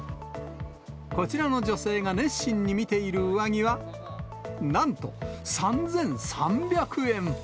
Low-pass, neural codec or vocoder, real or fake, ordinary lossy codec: none; none; real; none